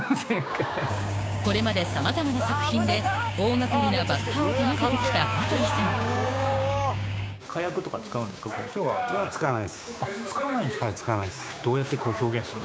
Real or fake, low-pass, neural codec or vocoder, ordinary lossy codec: fake; none; codec, 16 kHz, 6 kbps, DAC; none